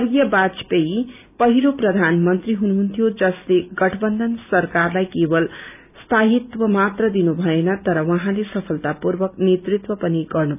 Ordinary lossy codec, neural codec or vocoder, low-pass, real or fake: none; none; 3.6 kHz; real